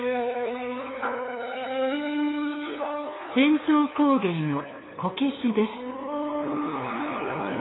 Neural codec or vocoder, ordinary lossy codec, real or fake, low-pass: codec, 16 kHz, 2 kbps, FunCodec, trained on LibriTTS, 25 frames a second; AAC, 16 kbps; fake; 7.2 kHz